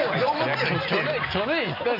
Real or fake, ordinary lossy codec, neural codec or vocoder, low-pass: real; none; none; 5.4 kHz